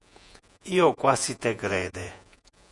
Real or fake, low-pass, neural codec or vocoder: fake; 10.8 kHz; vocoder, 48 kHz, 128 mel bands, Vocos